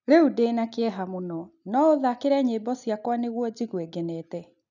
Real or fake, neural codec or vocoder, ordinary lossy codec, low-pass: real; none; none; 7.2 kHz